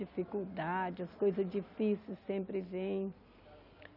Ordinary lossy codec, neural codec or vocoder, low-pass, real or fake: none; none; 5.4 kHz; real